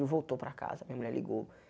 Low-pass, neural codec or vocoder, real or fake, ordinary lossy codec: none; none; real; none